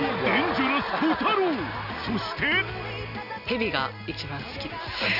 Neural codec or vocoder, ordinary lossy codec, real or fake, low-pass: none; none; real; 5.4 kHz